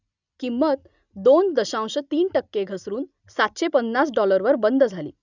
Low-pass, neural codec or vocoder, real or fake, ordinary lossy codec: 7.2 kHz; none; real; none